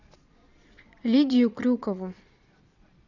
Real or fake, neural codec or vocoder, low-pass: fake; vocoder, 22.05 kHz, 80 mel bands, Vocos; 7.2 kHz